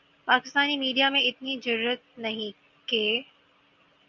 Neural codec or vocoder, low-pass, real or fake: none; 7.2 kHz; real